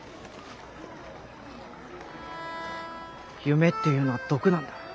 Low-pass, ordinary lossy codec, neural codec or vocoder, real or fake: none; none; none; real